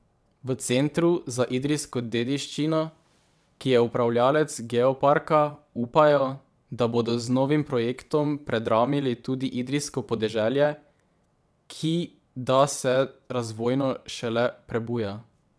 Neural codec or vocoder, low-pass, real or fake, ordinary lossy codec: vocoder, 22.05 kHz, 80 mel bands, WaveNeXt; none; fake; none